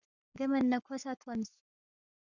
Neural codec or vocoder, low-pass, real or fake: codec, 44.1 kHz, 7.8 kbps, Pupu-Codec; 7.2 kHz; fake